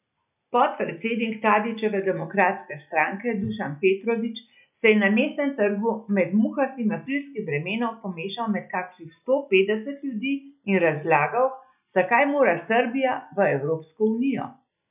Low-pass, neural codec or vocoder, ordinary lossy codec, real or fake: 3.6 kHz; none; none; real